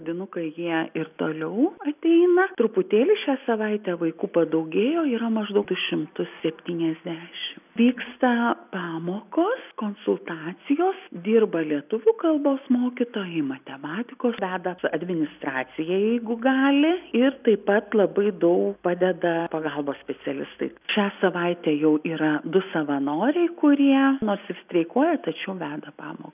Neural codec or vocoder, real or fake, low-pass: none; real; 3.6 kHz